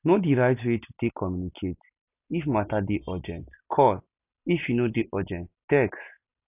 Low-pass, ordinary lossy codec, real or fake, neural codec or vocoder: 3.6 kHz; none; real; none